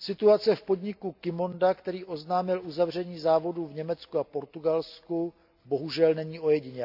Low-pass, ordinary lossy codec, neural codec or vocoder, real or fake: 5.4 kHz; AAC, 48 kbps; none; real